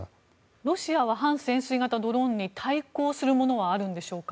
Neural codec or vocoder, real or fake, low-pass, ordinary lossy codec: none; real; none; none